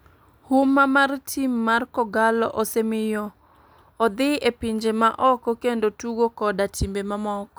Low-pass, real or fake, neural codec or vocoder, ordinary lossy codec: none; real; none; none